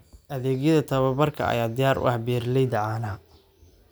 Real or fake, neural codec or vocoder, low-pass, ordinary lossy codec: real; none; none; none